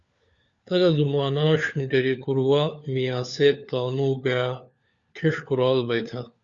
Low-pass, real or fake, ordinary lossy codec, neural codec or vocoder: 7.2 kHz; fake; Opus, 64 kbps; codec, 16 kHz, 4 kbps, FunCodec, trained on LibriTTS, 50 frames a second